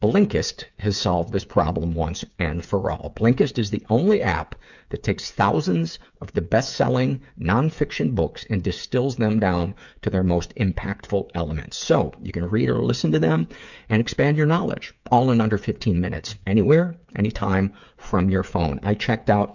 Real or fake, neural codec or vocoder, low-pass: fake; codec, 16 kHz, 8 kbps, FreqCodec, smaller model; 7.2 kHz